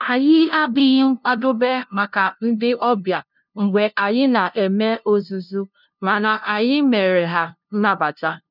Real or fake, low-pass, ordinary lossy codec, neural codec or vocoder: fake; 5.4 kHz; none; codec, 16 kHz, 0.5 kbps, FunCodec, trained on LibriTTS, 25 frames a second